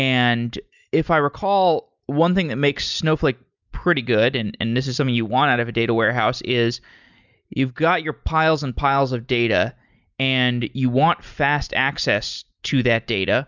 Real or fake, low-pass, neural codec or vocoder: real; 7.2 kHz; none